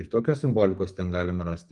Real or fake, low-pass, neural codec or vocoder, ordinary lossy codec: fake; 10.8 kHz; codec, 44.1 kHz, 2.6 kbps, SNAC; Opus, 32 kbps